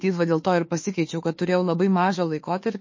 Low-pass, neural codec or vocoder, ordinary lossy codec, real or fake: 7.2 kHz; autoencoder, 48 kHz, 32 numbers a frame, DAC-VAE, trained on Japanese speech; MP3, 32 kbps; fake